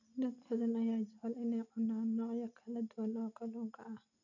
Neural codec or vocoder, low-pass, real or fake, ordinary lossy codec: none; 7.2 kHz; real; none